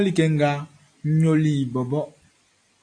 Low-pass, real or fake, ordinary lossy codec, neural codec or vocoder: 9.9 kHz; real; AAC, 48 kbps; none